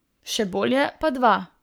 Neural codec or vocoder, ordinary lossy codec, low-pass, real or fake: codec, 44.1 kHz, 7.8 kbps, Pupu-Codec; none; none; fake